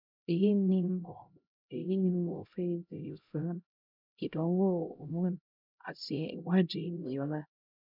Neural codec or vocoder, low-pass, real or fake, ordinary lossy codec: codec, 16 kHz, 0.5 kbps, X-Codec, HuBERT features, trained on LibriSpeech; 5.4 kHz; fake; none